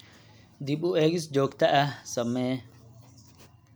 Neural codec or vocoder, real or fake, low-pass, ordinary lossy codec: none; real; none; none